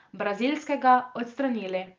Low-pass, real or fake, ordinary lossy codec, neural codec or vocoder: 7.2 kHz; real; Opus, 32 kbps; none